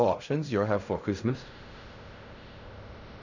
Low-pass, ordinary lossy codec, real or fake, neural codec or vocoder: 7.2 kHz; none; fake; codec, 16 kHz in and 24 kHz out, 0.4 kbps, LongCat-Audio-Codec, fine tuned four codebook decoder